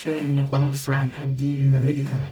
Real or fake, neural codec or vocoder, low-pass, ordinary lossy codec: fake; codec, 44.1 kHz, 0.9 kbps, DAC; none; none